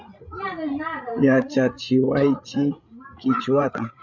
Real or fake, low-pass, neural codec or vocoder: fake; 7.2 kHz; codec, 16 kHz, 16 kbps, FreqCodec, larger model